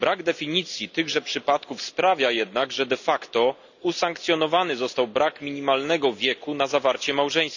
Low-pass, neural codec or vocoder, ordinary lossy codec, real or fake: 7.2 kHz; none; none; real